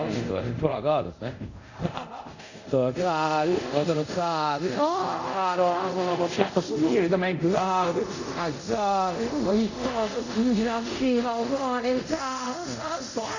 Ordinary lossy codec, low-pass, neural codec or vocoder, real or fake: none; 7.2 kHz; codec, 24 kHz, 0.5 kbps, DualCodec; fake